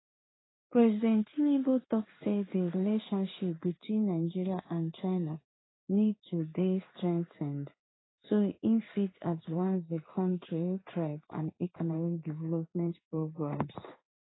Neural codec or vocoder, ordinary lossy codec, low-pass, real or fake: codec, 16 kHz in and 24 kHz out, 1 kbps, XY-Tokenizer; AAC, 16 kbps; 7.2 kHz; fake